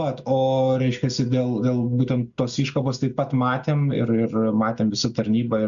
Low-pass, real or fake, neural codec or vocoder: 7.2 kHz; real; none